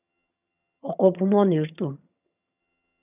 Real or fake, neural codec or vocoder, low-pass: fake; vocoder, 22.05 kHz, 80 mel bands, HiFi-GAN; 3.6 kHz